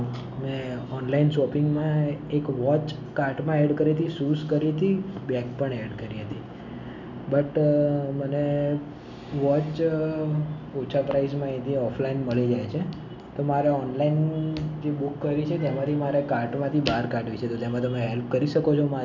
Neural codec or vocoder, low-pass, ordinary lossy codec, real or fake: none; 7.2 kHz; none; real